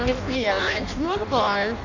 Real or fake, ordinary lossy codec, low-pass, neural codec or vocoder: fake; none; 7.2 kHz; codec, 16 kHz in and 24 kHz out, 0.6 kbps, FireRedTTS-2 codec